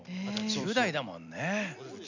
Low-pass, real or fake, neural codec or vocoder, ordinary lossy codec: 7.2 kHz; real; none; none